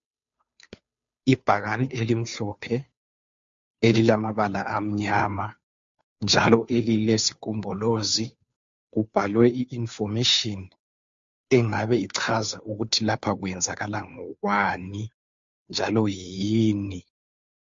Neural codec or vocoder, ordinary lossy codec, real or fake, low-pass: codec, 16 kHz, 2 kbps, FunCodec, trained on Chinese and English, 25 frames a second; MP3, 48 kbps; fake; 7.2 kHz